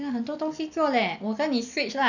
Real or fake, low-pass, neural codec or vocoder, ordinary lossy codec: real; 7.2 kHz; none; none